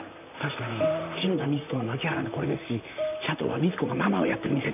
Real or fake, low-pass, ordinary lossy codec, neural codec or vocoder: fake; 3.6 kHz; none; vocoder, 44.1 kHz, 128 mel bands, Pupu-Vocoder